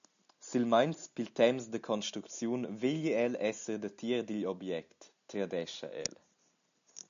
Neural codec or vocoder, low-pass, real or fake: none; 7.2 kHz; real